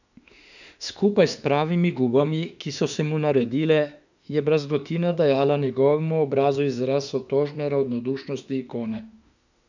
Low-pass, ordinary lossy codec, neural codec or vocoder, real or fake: 7.2 kHz; none; autoencoder, 48 kHz, 32 numbers a frame, DAC-VAE, trained on Japanese speech; fake